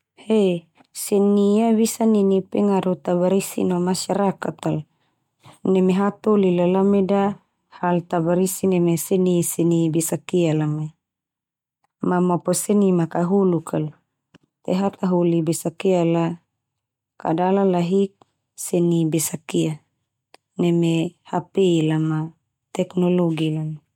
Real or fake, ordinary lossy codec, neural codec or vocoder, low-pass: real; MP3, 96 kbps; none; 19.8 kHz